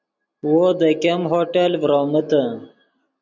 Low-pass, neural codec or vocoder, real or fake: 7.2 kHz; none; real